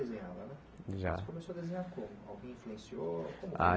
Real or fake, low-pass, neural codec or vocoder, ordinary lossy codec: real; none; none; none